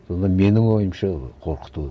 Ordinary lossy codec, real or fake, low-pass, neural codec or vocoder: none; real; none; none